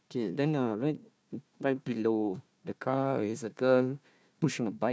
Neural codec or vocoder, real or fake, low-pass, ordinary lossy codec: codec, 16 kHz, 1 kbps, FunCodec, trained on Chinese and English, 50 frames a second; fake; none; none